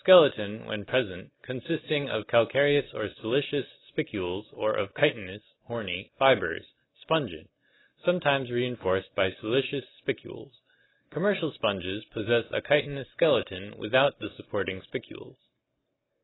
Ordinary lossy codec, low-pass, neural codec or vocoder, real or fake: AAC, 16 kbps; 7.2 kHz; none; real